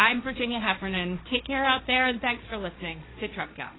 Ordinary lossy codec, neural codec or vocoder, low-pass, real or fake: AAC, 16 kbps; codec, 16 kHz, 1.1 kbps, Voila-Tokenizer; 7.2 kHz; fake